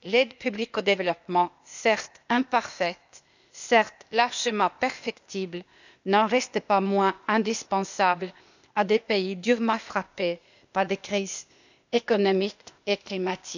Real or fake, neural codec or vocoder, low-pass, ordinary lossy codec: fake; codec, 16 kHz, 0.8 kbps, ZipCodec; 7.2 kHz; none